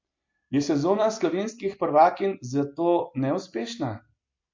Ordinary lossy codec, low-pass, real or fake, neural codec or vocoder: MP3, 48 kbps; 7.2 kHz; real; none